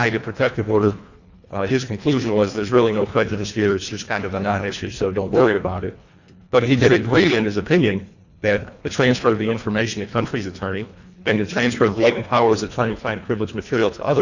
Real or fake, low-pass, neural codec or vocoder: fake; 7.2 kHz; codec, 24 kHz, 1.5 kbps, HILCodec